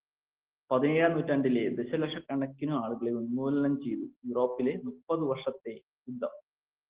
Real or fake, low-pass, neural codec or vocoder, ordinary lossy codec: real; 3.6 kHz; none; Opus, 16 kbps